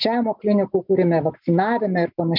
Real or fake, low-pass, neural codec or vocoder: real; 5.4 kHz; none